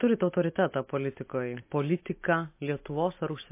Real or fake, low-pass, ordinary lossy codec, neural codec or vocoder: real; 3.6 kHz; MP3, 24 kbps; none